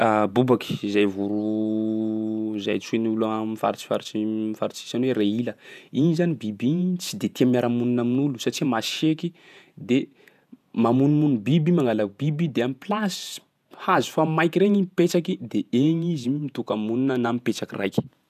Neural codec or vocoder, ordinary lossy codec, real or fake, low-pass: vocoder, 48 kHz, 128 mel bands, Vocos; none; fake; 14.4 kHz